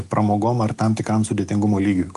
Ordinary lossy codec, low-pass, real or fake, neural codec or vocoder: Opus, 32 kbps; 10.8 kHz; real; none